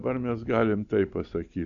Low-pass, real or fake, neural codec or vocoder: 7.2 kHz; real; none